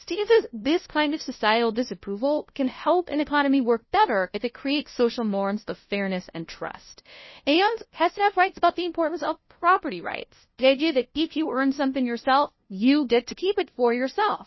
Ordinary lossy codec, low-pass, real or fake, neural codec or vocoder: MP3, 24 kbps; 7.2 kHz; fake; codec, 16 kHz, 0.5 kbps, FunCodec, trained on LibriTTS, 25 frames a second